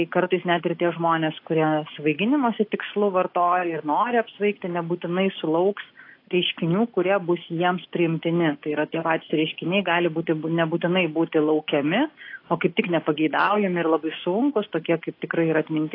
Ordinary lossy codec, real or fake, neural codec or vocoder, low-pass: AAC, 32 kbps; real; none; 5.4 kHz